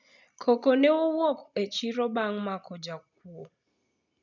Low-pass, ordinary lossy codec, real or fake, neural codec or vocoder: 7.2 kHz; none; real; none